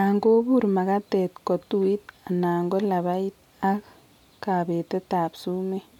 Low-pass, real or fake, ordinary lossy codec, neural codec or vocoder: 19.8 kHz; real; none; none